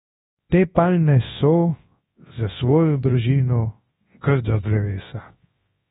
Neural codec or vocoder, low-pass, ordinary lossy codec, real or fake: codec, 24 kHz, 0.9 kbps, WavTokenizer, large speech release; 10.8 kHz; AAC, 16 kbps; fake